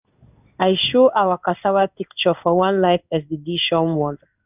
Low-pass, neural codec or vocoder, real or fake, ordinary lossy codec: 3.6 kHz; codec, 16 kHz in and 24 kHz out, 1 kbps, XY-Tokenizer; fake; none